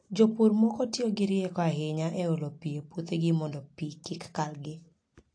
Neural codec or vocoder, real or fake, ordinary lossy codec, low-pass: none; real; AAC, 48 kbps; 9.9 kHz